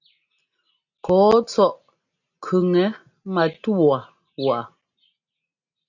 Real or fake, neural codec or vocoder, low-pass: real; none; 7.2 kHz